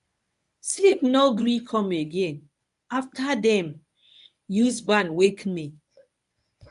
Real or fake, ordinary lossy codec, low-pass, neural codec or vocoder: fake; none; 10.8 kHz; codec, 24 kHz, 0.9 kbps, WavTokenizer, medium speech release version 1